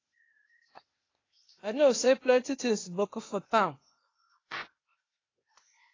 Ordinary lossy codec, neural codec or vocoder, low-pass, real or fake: AAC, 32 kbps; codec, 16 kHz, 0.8 kbps, ZipCodec; 7.2 kHz; fake